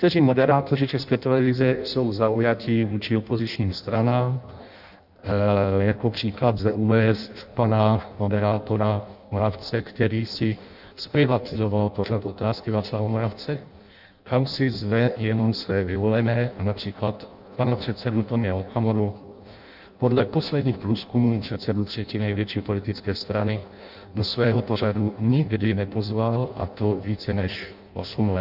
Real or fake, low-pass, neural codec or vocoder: fake; 5.4 kHz; codec, 16 kHz in and 24 kHz out, 0.6 kbps, FireRedTTS-2 codec